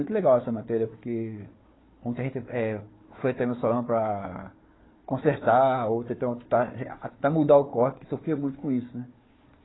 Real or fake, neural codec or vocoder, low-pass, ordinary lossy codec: fake; codec, 16 kHz, 4 kbps, FunCodec, trained on Chinese and English, 50 frames a second; 7.2 kHz; AAC, 16 kbps